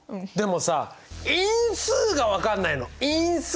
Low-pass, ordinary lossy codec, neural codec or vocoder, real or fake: none; none; none; real